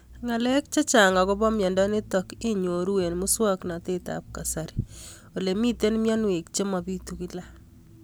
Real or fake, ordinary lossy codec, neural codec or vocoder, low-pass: real; none; none; none